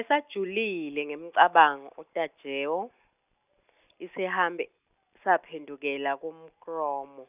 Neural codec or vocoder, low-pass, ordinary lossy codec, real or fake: none; 3.6 kHz; none; real